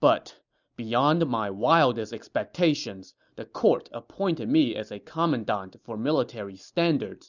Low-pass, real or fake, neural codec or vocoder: 7.2 kHz; real; none